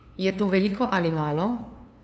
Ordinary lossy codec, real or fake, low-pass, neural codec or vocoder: none; fake; none; codec, 16 kHz, 2 kbps, FunCodec, trained on LibriTTS, 25 frames a second